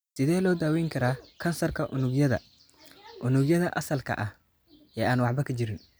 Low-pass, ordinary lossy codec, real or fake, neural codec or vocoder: none; none; real; none